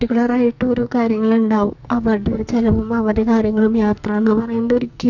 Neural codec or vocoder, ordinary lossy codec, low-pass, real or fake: codec, 44.1 kHz, 2.6 kbps, SNAC; none; 7.2 kHz; fake